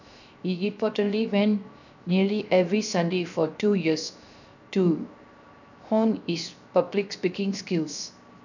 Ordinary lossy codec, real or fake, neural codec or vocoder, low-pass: none; fake; codec, 16 kHz, 0.7 kbps, FocalCodec; 7.2 kHz